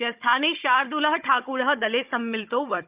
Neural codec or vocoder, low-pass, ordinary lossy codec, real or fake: codec, 16 kHz, 16 kbps, FunCodec, trained on Chinese and English, 50 frames a second; 3.6 kHz; Opus, 24 kbps; fake